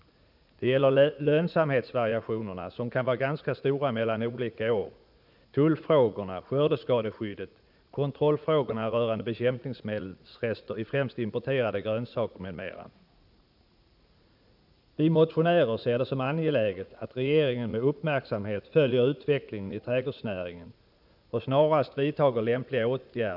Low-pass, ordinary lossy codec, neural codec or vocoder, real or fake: 5.4 kHz; none; vocoder, 44.1 kHz, 80 mel bands, Vocos; fake